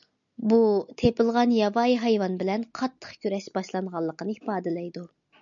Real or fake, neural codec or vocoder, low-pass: real; none; 7.2 kHz